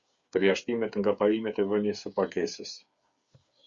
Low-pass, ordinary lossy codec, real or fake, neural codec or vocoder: 7.2 kHz; Opus, 64 kbps; fake; codec, 16 kHz, 8 kbps, FreqCodec, smaller model